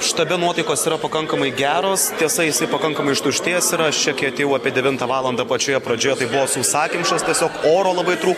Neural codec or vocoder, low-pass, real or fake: none; 19.8 kHz; real